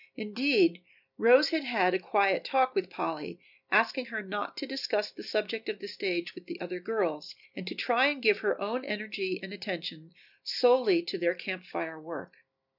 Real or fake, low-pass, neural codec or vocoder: real; 5.4 kHz; none